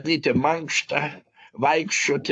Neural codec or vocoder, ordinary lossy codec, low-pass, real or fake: codec, 44.1 kHz, 7.8 kbps, Pupu-Codec; AAC, 64 kbps; 9.9 kHz; fake